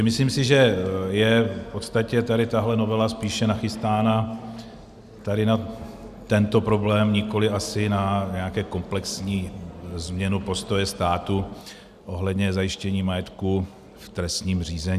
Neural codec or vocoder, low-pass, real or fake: none; 14.4 kHz; real